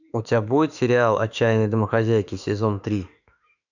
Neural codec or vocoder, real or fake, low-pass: autoencoder, 48 kHz, 32 numbers a frame, DAC-VAE, trained on Japanese speech; fake; 7.2 kHz